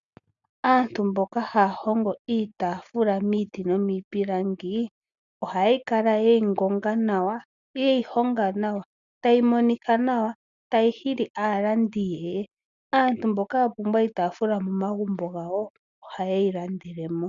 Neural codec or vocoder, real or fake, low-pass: none; real; 7.2 kHz